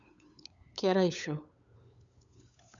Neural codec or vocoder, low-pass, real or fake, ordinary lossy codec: codec, 16 kHz, 8 kbps, FunCodec, trained on LibriTTS, 25 frames a second; 7.2 kHz; fake; none